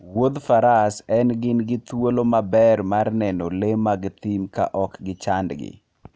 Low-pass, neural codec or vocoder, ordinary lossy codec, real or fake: none; none; none; real